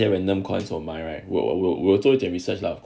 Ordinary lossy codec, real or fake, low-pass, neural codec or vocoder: none; real; none; none